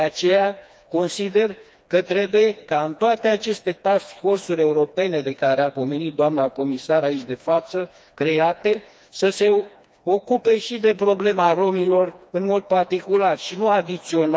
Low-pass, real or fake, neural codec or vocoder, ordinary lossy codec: none; fake; codec, 16 kHz, 2 kbps, FreqCodec, smaller model; none